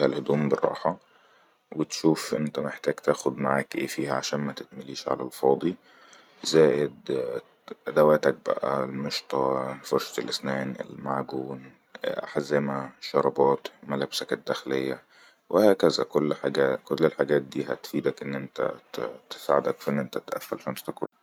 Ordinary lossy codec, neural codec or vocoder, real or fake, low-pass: none; vocoder, 44.1 kHz, 128 mel bands every 512 samples, BigVGAN v2; fake; 19.8 kHz